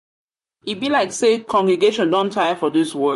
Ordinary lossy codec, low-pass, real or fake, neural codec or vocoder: MP3, 48 kbps; 14.4 kHz; fake; vocoder, 44.1 kHz, 128 mel bands, Pupu-Vocoder